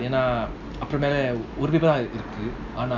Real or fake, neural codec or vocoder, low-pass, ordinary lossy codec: real; none; 7.2 kHz; none